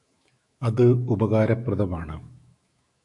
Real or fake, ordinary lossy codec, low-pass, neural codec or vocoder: fake; MP3, 96 kbps; 10.8 kHz; autoencoder, 48 kHz, 128 numbers a frame, DAC-VAE, trained on Japanese speech